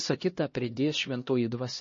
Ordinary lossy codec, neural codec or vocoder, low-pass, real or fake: MP3, 32 kbps; codec, 16 kHz, 1 kbps, X-Codec, HuBERT features, trained on LibriSpeech; 7.2 kHz; fake